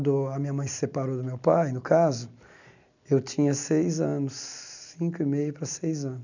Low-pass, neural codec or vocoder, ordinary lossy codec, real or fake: 7.2 kHz; none; none; real